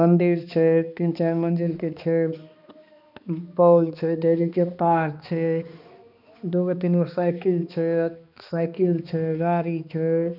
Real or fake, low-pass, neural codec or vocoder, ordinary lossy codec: fake; 5.4 kHz; codec, 16 kHz, 2 kbps, X-Codec, HuBERT features, trained on balanced general audio; none